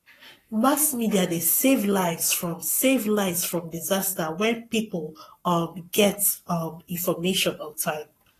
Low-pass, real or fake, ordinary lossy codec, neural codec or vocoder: 14.4 kHz; fake; AAC, 48 kbps; codec, 44.1 kHz, 7.8 kbps, Pupu-Codec